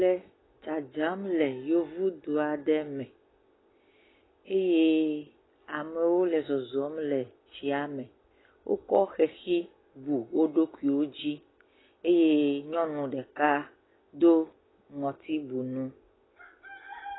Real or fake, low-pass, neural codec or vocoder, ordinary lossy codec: real; 7.2 kHz; none; AAC, 16 kbps